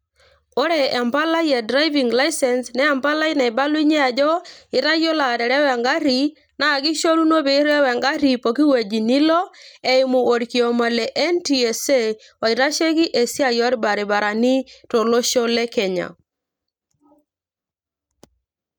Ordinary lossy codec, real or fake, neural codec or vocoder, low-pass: none; real; none; none